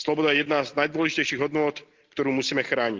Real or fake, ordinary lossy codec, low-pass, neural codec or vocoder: real; Opus, 32 kbps; 7.2 kHz; none